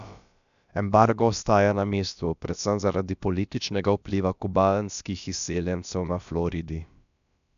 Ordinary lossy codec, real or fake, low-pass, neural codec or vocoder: none; fake; 7.2 kHz; codec, 16 kHz, about 1 kbps, DyCAST, with the encoder's durations